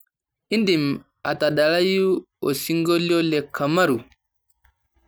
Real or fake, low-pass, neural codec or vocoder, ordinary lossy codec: real; none; none; none